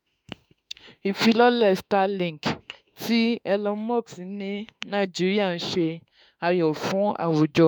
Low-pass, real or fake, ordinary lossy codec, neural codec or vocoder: none; fake; none; autoencoder, 48 kHz, 32 numbers a frame, DAC-VAE, trained on Japanese speech